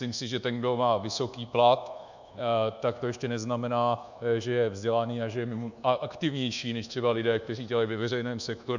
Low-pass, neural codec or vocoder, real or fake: 7.2 kHz; codec, 24 kHz, 1.2 kbps, DualCodec; fake